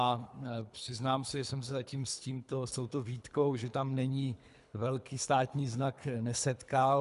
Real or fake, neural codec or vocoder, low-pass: fake; codec, 24 kHz, 3 kbps, HILCodec; 10.8 kHz